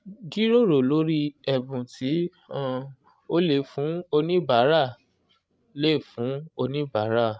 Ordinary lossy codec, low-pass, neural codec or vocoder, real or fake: none; none; codec, 16 kHz, 16 kbps, FreqCodec, larger model; fake